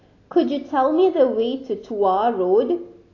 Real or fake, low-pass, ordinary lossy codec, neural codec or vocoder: real; 7.2 kHz; AAC, 32 kbps; none